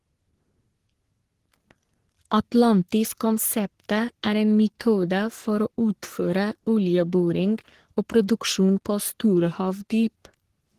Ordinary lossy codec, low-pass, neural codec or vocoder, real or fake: Opus, 16 kbps; 14.4 kHz; codec, 44.1 kHz, 3.4 kbps, Pupu-Codec; fake